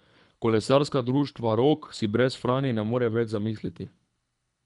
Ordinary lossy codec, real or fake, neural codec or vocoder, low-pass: none; fake; codec, 24 kHz, 3 kbps, HILCodec; 10.8 kHz